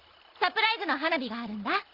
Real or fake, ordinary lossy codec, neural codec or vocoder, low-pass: real; Opus, 24 kbps; none; 5.4 kHz